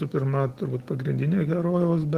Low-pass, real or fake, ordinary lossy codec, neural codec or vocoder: 14.4 kHz; real; Opus, 16 kbps; none